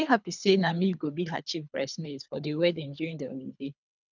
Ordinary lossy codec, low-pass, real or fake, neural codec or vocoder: none; 7.2 kHz; fake; codec, 24 kHz, 3 kbps, HILCodec